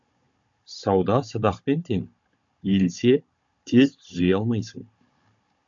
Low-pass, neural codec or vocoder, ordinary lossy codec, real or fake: 7.2 kHz; codec, 16 kHz, 16 kbps, FunCodec, trained on Chinese and English, 50 frames a second; AAC, 64 kbps; fake